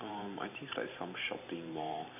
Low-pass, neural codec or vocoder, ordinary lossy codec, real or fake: 3.6 kHz; vocoder, 44.1 kHz, 128 mel bands every 512 samples, BigVGAN v2; none; fake